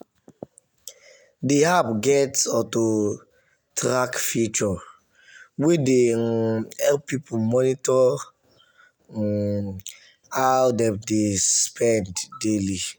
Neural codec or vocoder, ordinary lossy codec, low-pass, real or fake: none; none; none; real